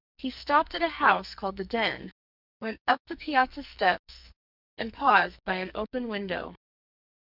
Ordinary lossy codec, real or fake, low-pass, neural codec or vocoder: Opus, 64 kbps; fake; 5.4 kHz; codec, 44.1 kHz, 2.6 kbps, SNAC